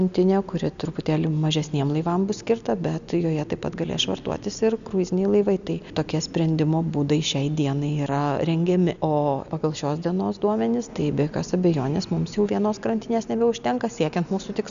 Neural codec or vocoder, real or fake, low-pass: none; real; 7.2 kHz